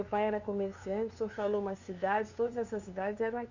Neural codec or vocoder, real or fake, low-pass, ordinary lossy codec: codec, 16 kHz, 2 kbps, FunCodec, trained on LibriTTS, 25 frames a second; fake; 7.2 kHz; none